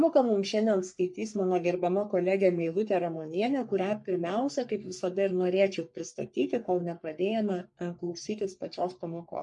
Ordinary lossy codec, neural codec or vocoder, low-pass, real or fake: MP3, 64 kbps; codec, 44.1 kHz, 3.4 kbps, Pupu-Codec; 10.8 kHz; fake